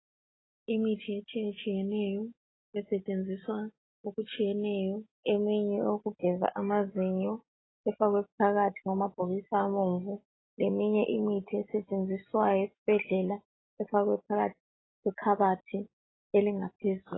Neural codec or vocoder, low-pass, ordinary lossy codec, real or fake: none; 7.2 kHz; AAC, 16 kbps; real